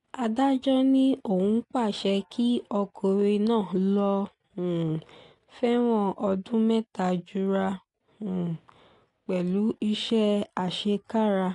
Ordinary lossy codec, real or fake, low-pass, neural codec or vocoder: AAC, 48 kbps; real; 10.8 kHz; none